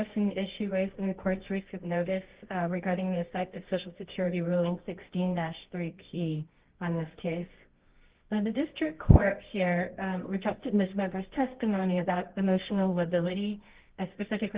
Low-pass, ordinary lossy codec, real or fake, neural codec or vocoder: 3.6 kHz; Opus, 16 kbps; fake; codec, 24 kHz, 0.9 kbps, WavTokenizer, medium music audio release